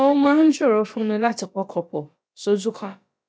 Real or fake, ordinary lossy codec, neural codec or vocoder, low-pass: fake; none; codec, 16 kHz, about 1 kbps, DyCAST, with the encoder's durations; none